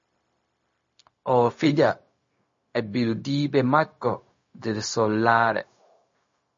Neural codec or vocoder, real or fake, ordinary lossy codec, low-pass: codec, 16 kHz, 0.4 kbps, LongCat-Audio-Codec; fake; MP3, 32 kbps; 7.2 kHz